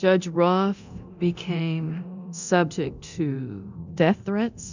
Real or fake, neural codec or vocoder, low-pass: fake; codec, 24 kHz, 0.9 kbps, DualCodec; 7.2 kHz